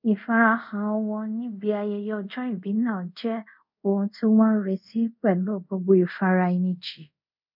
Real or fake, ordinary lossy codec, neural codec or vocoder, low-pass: fake; none; codec, 24 kHz, 0.5 kbps, DualCodec; 5.4 kHz